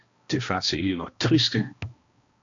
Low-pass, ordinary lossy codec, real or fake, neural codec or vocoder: 7.2 kHz; AAC, 48 kbps; fake; codec, 16 kHz, 1 kbps, X-Codec, HuBERT features, trained on balanced general audio